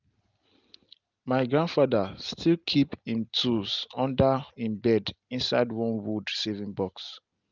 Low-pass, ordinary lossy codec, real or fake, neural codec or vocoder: 7.2 kHz; Opus, 24 kbps; real; none